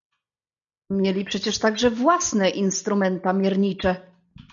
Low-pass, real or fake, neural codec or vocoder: 7.2 kHz; real; none